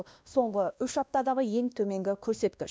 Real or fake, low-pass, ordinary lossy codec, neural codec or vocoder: fake; none; none; codec, 16 kHz, 1 kbps, X-Codec, WavLM features, trained on Multilingual LibriSpeech